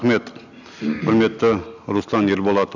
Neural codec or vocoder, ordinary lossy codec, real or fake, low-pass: none; none; real; 7.2 kHz